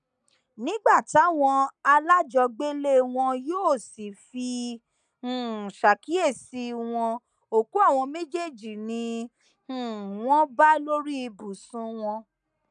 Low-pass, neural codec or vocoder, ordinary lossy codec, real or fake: 9.9 kHz; none; none; real